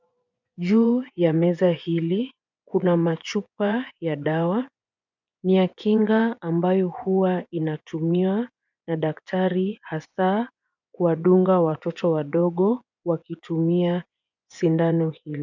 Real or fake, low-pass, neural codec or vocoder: fake; 7.2 kHz; vocoder, 24 kHz, 100 mel bands, Vocos